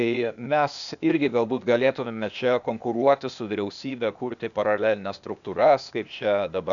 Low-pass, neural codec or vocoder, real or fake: 7.2 kHz; codec, 16 kHz, 0.8 kbps, ZipCodec; fake